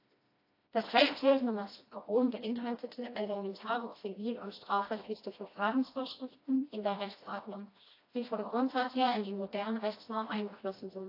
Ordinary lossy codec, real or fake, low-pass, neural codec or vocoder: AAC, 32 kbps; fake; 5.4 kHz; codec, 16 kHz, 1 kbps, FreqCodec, smaller model